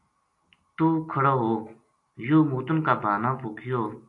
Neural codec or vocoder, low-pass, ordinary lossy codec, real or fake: none; 10.8 kHz; AAC, 48 kbps; real